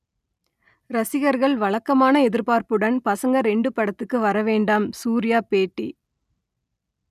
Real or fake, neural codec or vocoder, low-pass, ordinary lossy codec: real; none; 14.4 kHz; none